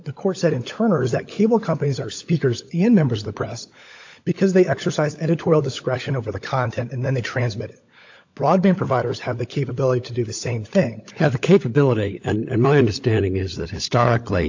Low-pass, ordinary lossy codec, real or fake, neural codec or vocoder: 7.2 kHz; AAC, 48 kbps; fake; codec, 16 kHz, 16 kbps, FunCodec, trained on LibriTTS, 50 frames a second